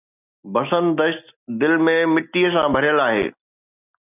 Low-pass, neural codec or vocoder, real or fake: 3.6 kHz; none; real